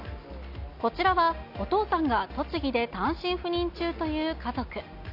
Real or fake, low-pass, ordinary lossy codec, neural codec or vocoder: real; 5.4 kHz; none; none